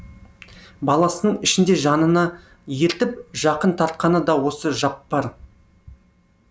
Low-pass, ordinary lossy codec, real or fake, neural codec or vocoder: none; none; real; none